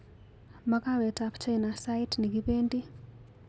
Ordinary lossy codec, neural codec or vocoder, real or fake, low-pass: none; none; real; none